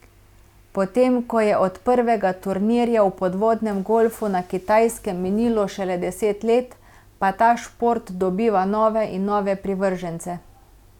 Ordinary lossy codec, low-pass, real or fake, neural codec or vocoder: none; 19.8 kHz; real; none